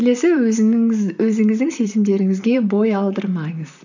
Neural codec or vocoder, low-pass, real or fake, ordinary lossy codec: vocoder, 44.1 kHz, 128 mel bands, Pupu-Vocoder; 7.2 kHz; fake; none